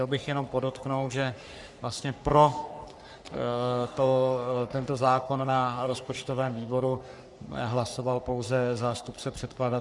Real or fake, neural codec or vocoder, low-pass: fake; codec, 44.1 kHz, 3.4 kbps, Pupu-Codec; 10.8 kHz